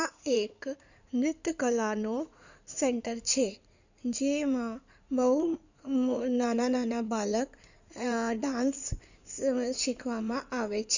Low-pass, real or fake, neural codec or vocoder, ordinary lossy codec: 7.2 kHz; fake; codec, 16 kHz in and 24 kHz out, 2.2 kbps, FireRedTTS-2 codec; none